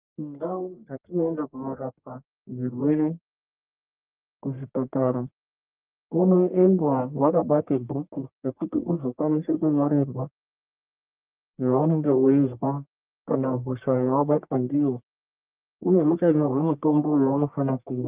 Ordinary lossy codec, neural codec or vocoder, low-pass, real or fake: Opus, 32 kbps; codec, 44.1 kHz, 1.7 kbps, Pupu-Codec; 3.6 kHz; fake